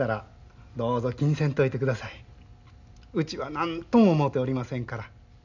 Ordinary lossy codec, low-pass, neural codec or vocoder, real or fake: none; 7.2 kHz; none; real